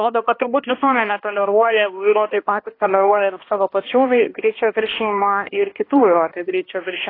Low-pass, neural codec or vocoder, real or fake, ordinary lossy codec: 5.4 kHz; codec, 16 kHz, 1 kbps, X-Codec, HuBERT features, trained on balanced general audio; fake; AAC, 24 kbps